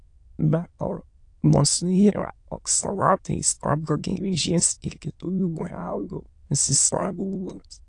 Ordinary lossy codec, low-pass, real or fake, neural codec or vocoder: AAC, 48 kbps; 9.9 kHz; fake; autoencoder, 22.05 kHz, a latent of 192 numbers a frame, VITS, trained on many speakers